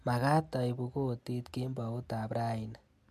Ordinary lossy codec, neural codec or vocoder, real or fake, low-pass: MP3, 64 kbps; none; real; 14.4 kHz